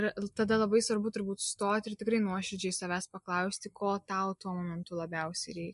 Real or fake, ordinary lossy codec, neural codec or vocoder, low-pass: real; MP3, 64 kbps; none; 10.8 kHz